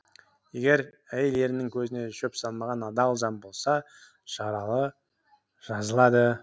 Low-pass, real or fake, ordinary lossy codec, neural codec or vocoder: none; real; none; none